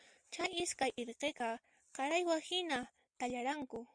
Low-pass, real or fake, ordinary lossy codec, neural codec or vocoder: 9.9 kHz; real; Opus, 64 kbps; none